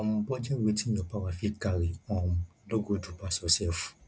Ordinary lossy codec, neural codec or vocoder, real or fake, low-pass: none; none; real; none